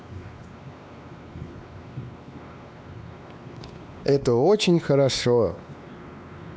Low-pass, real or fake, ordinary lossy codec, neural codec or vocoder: none; fake; none; codec, 16 kHz, 2 kbps, X-Codec, WavLM features, trained on Multilingual LibriSpeech